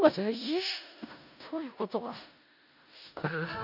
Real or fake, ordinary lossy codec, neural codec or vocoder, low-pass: fake; none; codec, 16 kHz in and 24 kHz out, 0.4 kbps, LongCat-Audio-Codec, four codebook decoder; 5.4 kHz